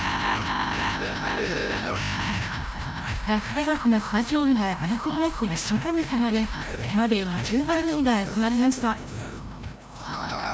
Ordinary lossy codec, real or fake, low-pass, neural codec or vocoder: none; fake; none; codec, 16 kHz, 0.5 kbps, FreqCodec, larger model